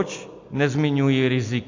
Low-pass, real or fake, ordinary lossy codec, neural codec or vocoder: 7.2 kHz; real; AAC, 48 kbps; none